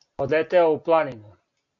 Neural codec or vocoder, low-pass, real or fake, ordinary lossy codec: none; 7.2 kHz; real; MP3, 64 kbps